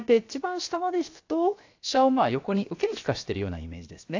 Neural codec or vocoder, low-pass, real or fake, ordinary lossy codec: codec, 16 kHz, about 1 kbps, DyCAST, with the encoder's durations; 7.2 kHz; fake; AAC, 48 kbps